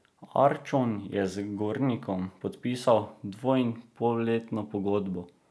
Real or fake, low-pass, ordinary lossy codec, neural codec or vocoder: real; none; none; none